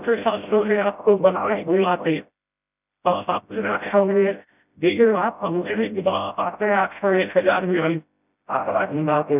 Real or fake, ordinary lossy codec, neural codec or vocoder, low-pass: fake; none; codec, 16 kHz, 0.5 kbps, FreqCodec, smaller model; 3.6 kHz